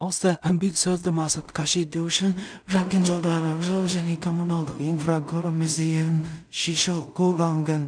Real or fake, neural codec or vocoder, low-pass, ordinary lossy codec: fake; codec, 16 kHz in and 24 kHz out, 0.4 kbps, LongCat-Audio-Codec, two codebook decoder; 9.9 kHz; none